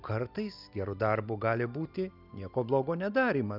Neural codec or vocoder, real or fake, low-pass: none; real; 5.4 kHz